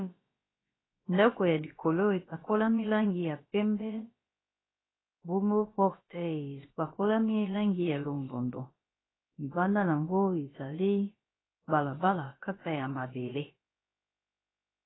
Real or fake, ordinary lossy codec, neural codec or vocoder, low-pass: fake; AAC, 16 kbps; codec, 16 kHz, about 1 kbps, DyCAST, with the encoder's durations; 7.2 kHz